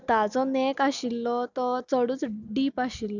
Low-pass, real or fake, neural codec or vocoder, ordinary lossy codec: 7.2 kHz; real; none; none